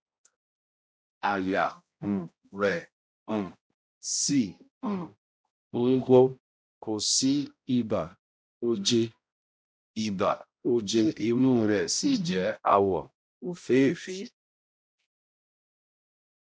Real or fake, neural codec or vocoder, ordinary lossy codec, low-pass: fake; codec, 16 kHz, 0.5 kbps, X-Codec, HuBERT features, trained on balanced general audio; none; none